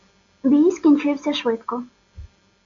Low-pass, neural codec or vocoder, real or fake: 7.2 kHz; none; real